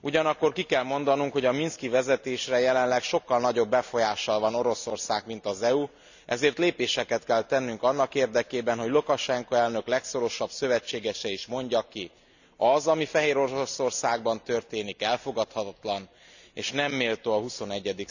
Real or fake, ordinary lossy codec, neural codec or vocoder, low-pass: real; none; none; 7.2 kHz